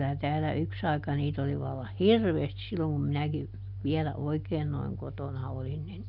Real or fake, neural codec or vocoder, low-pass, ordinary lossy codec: real; none; 5.4 kHz; none